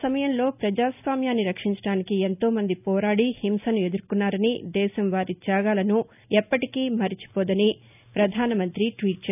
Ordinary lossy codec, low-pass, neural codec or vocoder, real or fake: none; 3.6 kHz; none; real